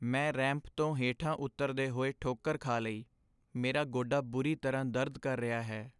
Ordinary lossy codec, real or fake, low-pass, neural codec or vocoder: none; real; 10.8 kHz; none